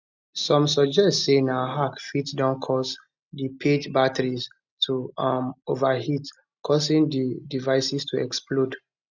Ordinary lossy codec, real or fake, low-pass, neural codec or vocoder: none; real; 7.2 kHz; none